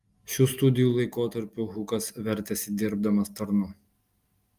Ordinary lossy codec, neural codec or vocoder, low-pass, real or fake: Opus, 32 kbps; none; 14.4 kHz; real